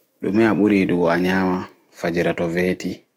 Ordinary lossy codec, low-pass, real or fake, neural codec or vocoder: AAC, 48 kbps; 19.8 kHz; fake; autoencoder, 48 kHz, 128 numbers a frame, DAC-VAE, trained on Japanese speech